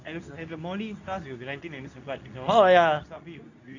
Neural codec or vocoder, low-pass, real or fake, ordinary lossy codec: codec, 24 kHz, 0.9 kbps, WavTokenizer, medium speech release version 2; 7.2 kHz; fake; none